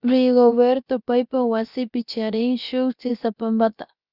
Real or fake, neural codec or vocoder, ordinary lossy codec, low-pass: fake; codec, 16 kHz, about 1 kbps, DyCAST, with the encoder's durations; Opus, 64 kbps; 5.4 kHz